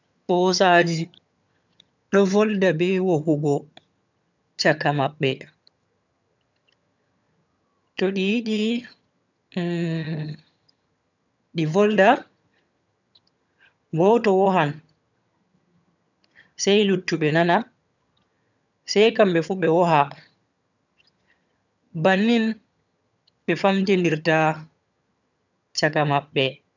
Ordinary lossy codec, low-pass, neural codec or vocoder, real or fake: none; 7.2 kHz; vocoder, 22.05 kHz, 80 mel bands, HiFi-GAN; fake